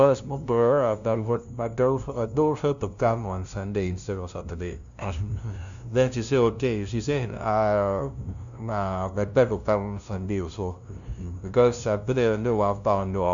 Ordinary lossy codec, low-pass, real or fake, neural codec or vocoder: MP3, 96 kbps; 7.2 kHz; fake; codec, 16 kHz, 0.5 kbps, FunCodec, trained on LibriTTS, 25 frames a second